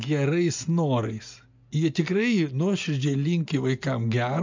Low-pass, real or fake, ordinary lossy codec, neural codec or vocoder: 7.2 kHz; real; MP3, 64 kbps; none